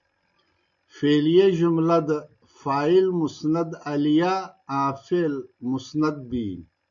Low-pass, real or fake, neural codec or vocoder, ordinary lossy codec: 7.2 kHz; real; none; MP3, 64 kbps